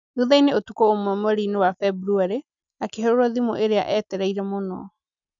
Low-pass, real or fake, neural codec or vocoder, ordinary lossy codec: 7.2 kHz; real; none; none